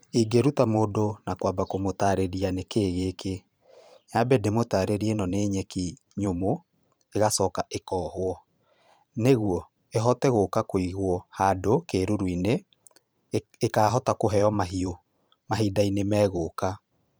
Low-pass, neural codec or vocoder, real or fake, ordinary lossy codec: none; vocoder, 44.1 kHz, 128 mel bands every 512 samples, BigVGAN v2; fake; none